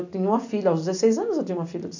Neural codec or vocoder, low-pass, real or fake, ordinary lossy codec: none; 7.2 kHz; real; none